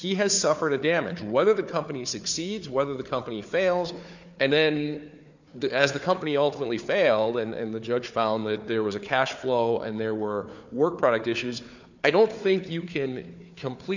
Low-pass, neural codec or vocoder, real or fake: 7.2 kHz; codec, 16 kHz, 4 kbps, FunCodec, trained on Chinese and English, 50 frames a second; fake